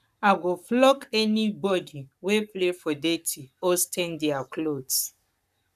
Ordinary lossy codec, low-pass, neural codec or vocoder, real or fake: none; 14.4 kHz; codec, 44.1 kHz, 7.8 kbps, Pupu-Codec; fake